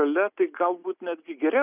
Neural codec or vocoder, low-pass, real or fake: none; 3.6 kHz; real